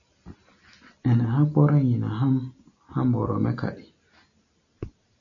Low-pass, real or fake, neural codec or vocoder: 7.2 kHz; real; none